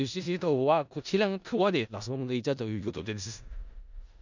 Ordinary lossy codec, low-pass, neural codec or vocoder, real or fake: none; 7.2 kHz; codec, 16 kHz in and 24 kHz out, 0.4 kbps, LongCat-Audio-Codec, four codebook decoder; fake